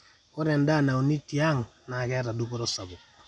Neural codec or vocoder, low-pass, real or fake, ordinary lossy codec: none; 10.8 kHz; real; none